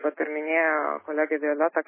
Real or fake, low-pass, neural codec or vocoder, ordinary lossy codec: real; 3.6 kHz; none; MP3, 16 kbps